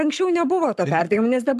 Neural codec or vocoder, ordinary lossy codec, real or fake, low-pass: codec, 44.1 kHz, 7.8 kbps, Pupu-Codec; AAC, 96 kbps; fake; 14.4 kHz